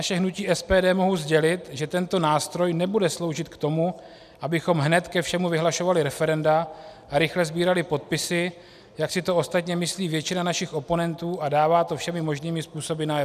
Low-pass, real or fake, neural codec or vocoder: 14.4 kHz; real; none